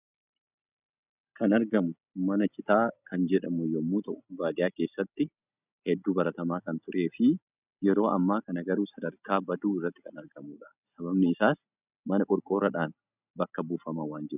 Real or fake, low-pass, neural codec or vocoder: real; 3.6 kHz; none